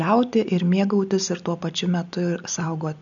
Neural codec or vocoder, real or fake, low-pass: none; real; 7.2 kHz